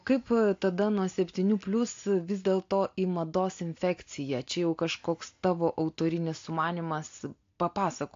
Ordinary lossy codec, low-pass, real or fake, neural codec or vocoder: AAC, 48 kbps; 7.2 kHz; real; none